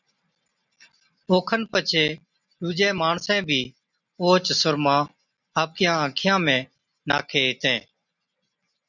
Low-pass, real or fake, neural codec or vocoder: 7.2 kHz; real; none